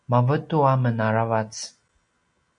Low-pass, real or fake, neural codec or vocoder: 9.9 kHz; real; none